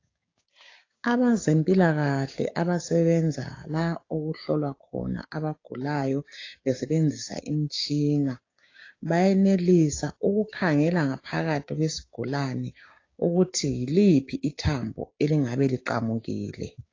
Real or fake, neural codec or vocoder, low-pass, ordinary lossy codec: fake; codec, 16 kHz, 6 kbps, DAC; 7.2 kHz; AAC, 32 kbps